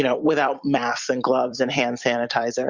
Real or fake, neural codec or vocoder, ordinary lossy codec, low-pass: fake; vocoder, 44.1 kHz, 128 mel bands every 256 samples, BigVGAN v2; Opus, 64 kbps; 7.2 kHz